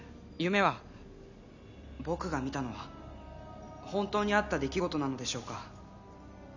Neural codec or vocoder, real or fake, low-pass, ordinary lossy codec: none; real; 7.2 kHz; none